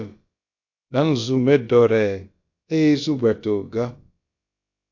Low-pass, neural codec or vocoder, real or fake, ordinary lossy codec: 7.2 kHz; codec, 16 kHz, about 1 kbps, DyCAST, with the encoder's durations; fake; AAC, 48 kbps